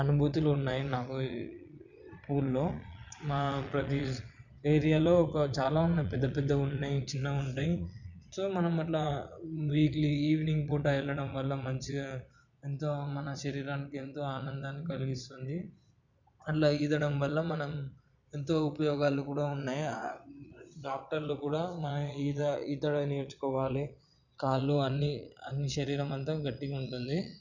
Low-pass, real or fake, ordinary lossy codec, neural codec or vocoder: 7.2 kHz; fake; none; vocoder, 22.05 kHz, 80 mel bands, Vocos